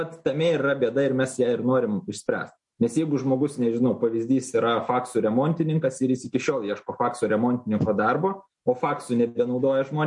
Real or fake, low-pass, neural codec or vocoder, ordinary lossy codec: real; 10.8 kHz; none; MP3, 48 kbps